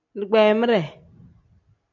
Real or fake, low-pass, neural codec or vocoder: real; 7.2 kHz; none